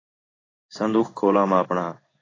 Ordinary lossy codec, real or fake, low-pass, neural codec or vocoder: AAC, 32 kbps; fake; 7.2 kHz; codec, 16 kHz in and 24 kHz out, 1 kbps, XY-Tokenizer